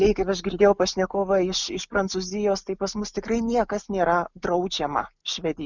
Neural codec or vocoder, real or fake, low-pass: none; real; 7.2 kHz